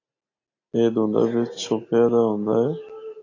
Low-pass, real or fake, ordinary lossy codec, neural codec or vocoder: 7.2 kHz; real; AAC, 32 kbps; none